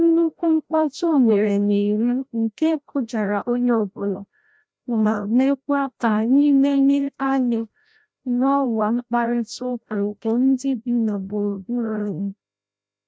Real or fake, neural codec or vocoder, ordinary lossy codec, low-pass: fake; codec, 16 kHz, 0.5 kbps, FreqCodec, larger model; none; none